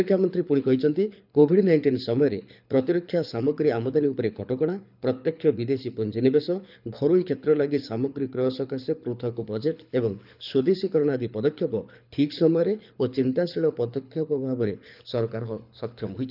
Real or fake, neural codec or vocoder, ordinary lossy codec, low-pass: fake; codec, 24 kHz, 6 kbps, HILCodec; none; 5.4 kHz